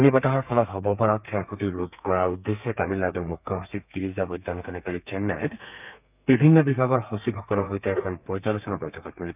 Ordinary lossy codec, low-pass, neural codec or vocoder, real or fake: none; 3.6 kHz; codec, 32 kHz, 1.9 kbps, SNAC; fake